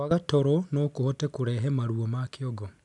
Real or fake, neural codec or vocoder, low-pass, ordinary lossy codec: real; none; 10.8 kHz; none